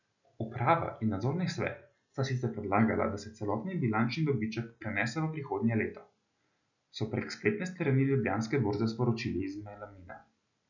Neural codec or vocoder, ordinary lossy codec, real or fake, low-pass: none; none; real; 7.2 kHz